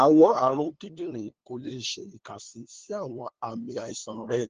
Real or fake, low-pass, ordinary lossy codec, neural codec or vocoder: fake; 7.2 kHz; Opus, 16 kbps; codec, 16 kHz, 2 kbps, FunCodec, trained on LibriTTS, 25 frames a second